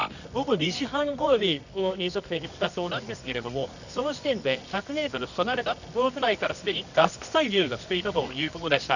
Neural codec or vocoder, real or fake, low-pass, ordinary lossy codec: codec, 24 kHz, 0.9 kbps, WavTokenizer, medium music audio release; fake; 7.2 kHz; none